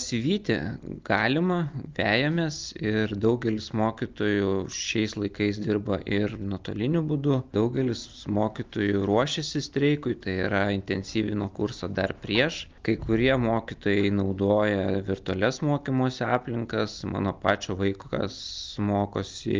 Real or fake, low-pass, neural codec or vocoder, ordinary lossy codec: real; 7.2 kHz; none; Opus, 24 kbps